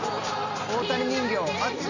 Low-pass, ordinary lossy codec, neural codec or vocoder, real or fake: 7.2 kHz; none; none; real